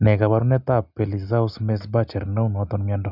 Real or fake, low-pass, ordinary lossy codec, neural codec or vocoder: real; 5.4 kHz; none; none